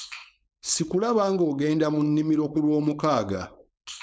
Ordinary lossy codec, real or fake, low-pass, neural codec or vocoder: none; fake; none; codec, 16 kHz, 4.8 kbps, FACodec